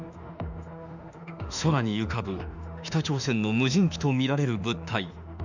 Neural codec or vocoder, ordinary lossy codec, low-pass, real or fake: autoencoder, 48 kHz, 32 numbers a frame, DAC-VAE, trained on Japanese speech; none; 7.2 kHz; fake